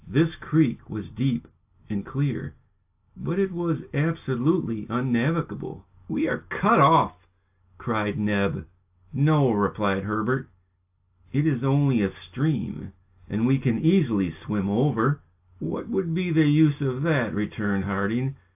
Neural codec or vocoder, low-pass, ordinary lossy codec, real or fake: none; 3.6 kHz; AAC, 32 kbps; real